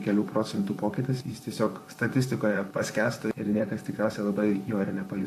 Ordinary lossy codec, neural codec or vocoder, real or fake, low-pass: AAC, 64 kbps; vocoder, 44.1 kHz, 128 mel bands, Pupu-Vocoder; fake; 14.4 kHz